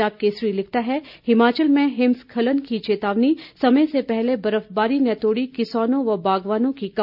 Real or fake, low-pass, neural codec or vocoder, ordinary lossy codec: real; 5.4 kHz; none; none